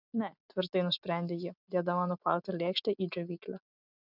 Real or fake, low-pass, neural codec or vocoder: real; 5.4 kHz; none